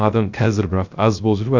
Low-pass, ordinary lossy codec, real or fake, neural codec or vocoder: 7.2 kHz; Opus, 64 kbps; fake; codec, 16 kHz, 0.3 kbps, FocalCodec